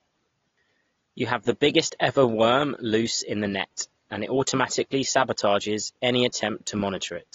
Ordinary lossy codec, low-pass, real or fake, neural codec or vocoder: AAC, 32 kbps; 7.2 kHz; real; none